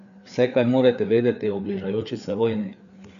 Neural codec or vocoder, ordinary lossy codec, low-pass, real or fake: codec, 16 kHz, 4 kbps, FreqCodec, larger model; none; 7.2 kHz; fake